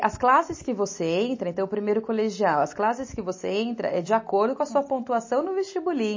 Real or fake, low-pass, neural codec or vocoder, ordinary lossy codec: real; 7.2 kHz; none; MP3, 32 kbps